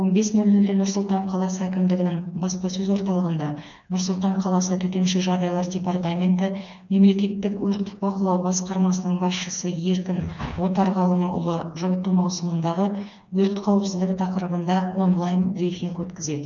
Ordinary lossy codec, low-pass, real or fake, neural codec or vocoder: none; 7.2 kHz; fake; codec, 16 kHz, 2 kbps, FreqCodec, smaller model